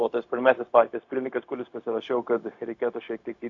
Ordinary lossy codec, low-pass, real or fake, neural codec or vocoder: AAC, 48 kbps; 7.2 kHz; fake; codec, 16 kHz, 0.4 kbps, LongCat-Audio-Codec